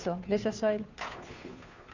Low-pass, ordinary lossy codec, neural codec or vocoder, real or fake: 7.2 kHz; Opus, 64 kbps; codec, 16 kHz, 2 kbps, FunCodec, trained on Chinese and English, 25 frames a second; fake